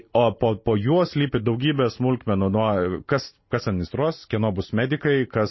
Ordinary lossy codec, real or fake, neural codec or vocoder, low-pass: MP3, 24 kbps; real; none; 7.2 kHz